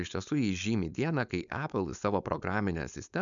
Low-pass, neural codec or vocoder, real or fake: 7.2 kHz; codec, 16 kHz, 4.8 kbps, FACodec; fake